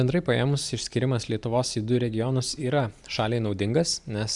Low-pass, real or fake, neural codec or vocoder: 10.8 kHz; fake; vocoder, 44.1 kHz, 128 mel bands every 512 samples, BigVGAN v2